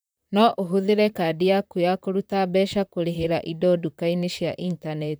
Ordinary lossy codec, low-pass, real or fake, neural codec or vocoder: none; none; fake; vocoder, 44.1 kHz, 128 mel bands, Pupu-Vocoder